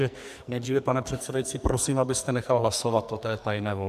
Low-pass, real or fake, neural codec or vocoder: 14.4 kHz; fake; codec, 44.1 kHz, 2.6 kbps, SNAC